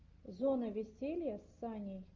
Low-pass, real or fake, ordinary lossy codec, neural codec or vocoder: 7.2 kHz; real; Opus, 24 kbps; none